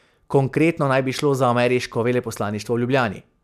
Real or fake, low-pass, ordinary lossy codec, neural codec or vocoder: real; 14.4 kHz; none; none